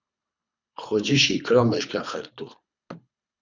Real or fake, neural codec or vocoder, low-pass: fake; codec, 24 kHz, 3 kbps, HILCodec; 7.2 kHz